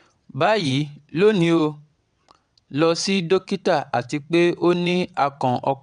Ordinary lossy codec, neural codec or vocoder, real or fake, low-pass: none; vocoder, 22.05 kHz, 80 mel bands, WaveNeXt; fake; 9.9 kHz